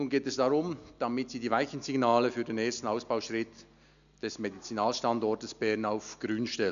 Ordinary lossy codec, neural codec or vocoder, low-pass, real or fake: none; none; 7.2 kHz; real